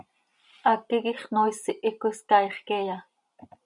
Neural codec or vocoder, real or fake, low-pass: none; real; 10.8 kHz